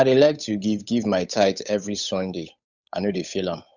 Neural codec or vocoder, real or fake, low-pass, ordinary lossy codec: codec, 16 kHz, 8 kbps, FunCodec, trained on Chinese and English, 25 frames a second; fake; 7.2 kHz; none